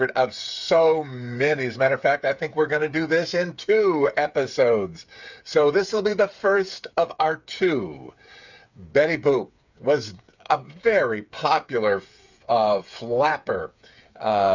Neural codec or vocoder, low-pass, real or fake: codec, 16 kHz, 8 kbps, FreqCodec, smaller model; 7.2 kHz; fake